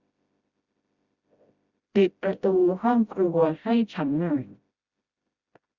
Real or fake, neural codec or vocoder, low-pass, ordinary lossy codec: fake; codec, 16 kHz, 0.5 kbps, FreqCodec, smaller model; 7.2 kHz; Opus, 64 kbps